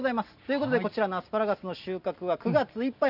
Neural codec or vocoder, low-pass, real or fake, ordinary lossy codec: none; 5.4 kHz; real; none